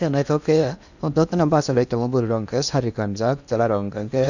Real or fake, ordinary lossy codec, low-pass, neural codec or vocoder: fake; none; 7.2 kHz; codec, 16 kHz in and 24 kHz out, 0.8 kbps, FocalCodec, streaming, 65536 codes